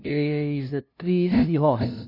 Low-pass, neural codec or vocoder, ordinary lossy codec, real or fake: 5.4 kHz; codec, 16 kHz, 0.5 kbps, FunCodec, trained on LibriTTS, 25 frames a second; none; fake